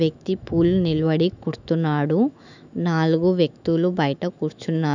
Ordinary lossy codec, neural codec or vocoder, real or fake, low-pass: none; autoencoder, 48 kHz, 128 numbers a frame, DAC-VAE, trained on Japanese speech; fake; 7.2 kHz